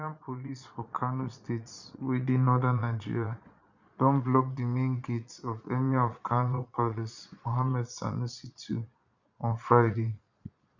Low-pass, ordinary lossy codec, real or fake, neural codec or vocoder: 7.2 kHz; none; fake; vocoder, 22.05 kHz, 80 mel bands, Vocos